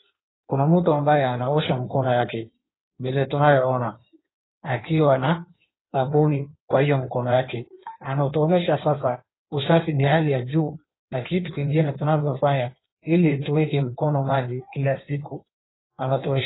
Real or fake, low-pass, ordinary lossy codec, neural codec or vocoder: fake; 7.2 kHz; AAC, 16 kbps; codec, 16 kHz in and 24 kHz out, 1.1 kbps, FireRedTTS-2 codec